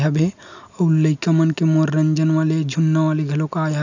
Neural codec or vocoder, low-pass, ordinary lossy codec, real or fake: none; 7.2 kHz; none; real